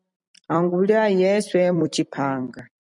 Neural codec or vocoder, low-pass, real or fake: vocoder, 44.1 kHz, 128 mel bands every 256 samples, BigVGAN v2; 10.8 kHz; fake